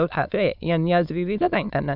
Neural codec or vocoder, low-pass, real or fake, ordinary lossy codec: autoencoder, 22.05 kHz, a latent of 192 numbers a frame, VITS, trained on many speakers; 5.4 kHz; fake; none